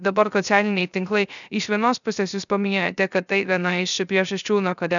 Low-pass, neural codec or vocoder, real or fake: 7.2 kHz; codec, 16 kHz, 0.3 kbps, FocalCodec; fake